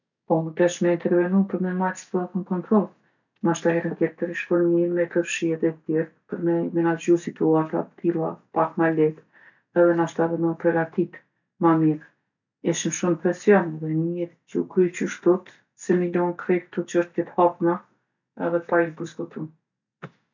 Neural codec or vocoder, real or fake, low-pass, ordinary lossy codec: none; real; 7.2 kHz; none